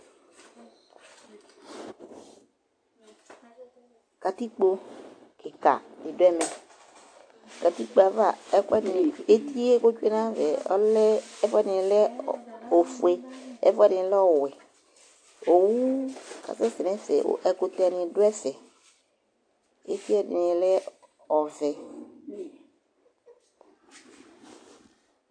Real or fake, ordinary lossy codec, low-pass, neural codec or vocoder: real; AAC, 48 kbps; 9.9 kHz; none